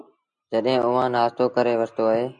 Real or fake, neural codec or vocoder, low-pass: real; none; 9.9 kHz